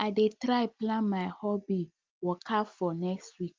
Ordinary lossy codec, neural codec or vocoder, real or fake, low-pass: Opus, 24 kbps; none; real; 7.2 kHz